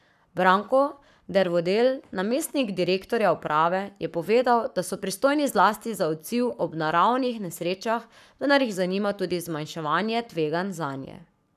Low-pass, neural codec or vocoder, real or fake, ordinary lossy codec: 14.4 kHz; codec, 44.1 kHz, 7.8 kbps, Pupu-Codec; fake; none